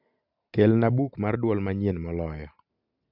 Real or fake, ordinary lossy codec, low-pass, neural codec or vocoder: real; AAC, 48 kbps; 5.4 kHz; none